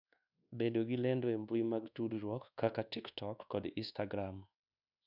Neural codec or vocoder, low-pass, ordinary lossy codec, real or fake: codec, 24 kHz, 1.2 kbps, DualCodec; 5.4 kHz; none; fake